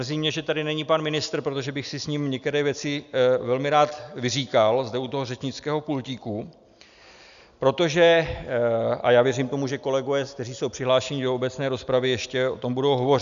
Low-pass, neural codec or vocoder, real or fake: 7.2 kHz; none; real